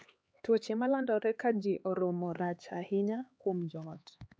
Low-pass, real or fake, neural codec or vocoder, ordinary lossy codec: none; fake; codec, 16 kHz, 4 kbps, X-Codec, HuBERT features, trained on LibriSpeech; none